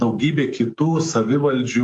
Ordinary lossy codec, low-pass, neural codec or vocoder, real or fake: AAC, 64 kbps; 10.8 kHz; codec, 44.1 kHz, 7.8 kbps, DAC; fake